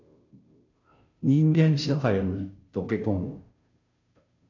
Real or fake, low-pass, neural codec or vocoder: fake; 7.2 kHz; codec, 16 kHz, 0.5 kbps, FunCodec, trained on Chinese and English, 25 frames a second